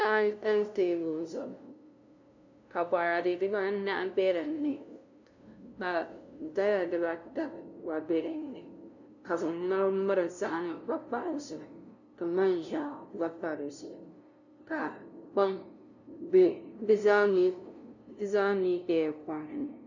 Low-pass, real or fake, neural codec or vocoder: 7.2 kHz; fake; codec, 16 kHz, 0.5 kbps, FunCodec, trained on LibriTTS, 25 frames a second